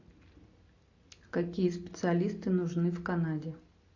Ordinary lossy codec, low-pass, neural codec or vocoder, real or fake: AAC, 48 kbps; 7.2 kHz; none; real